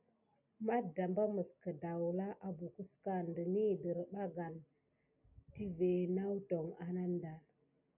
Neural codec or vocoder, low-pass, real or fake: none; 3.6 kHz; real